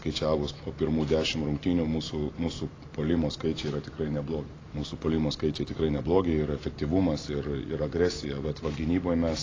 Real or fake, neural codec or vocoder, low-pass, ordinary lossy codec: real; none; 7.2 kHz; AAC, 32 kbps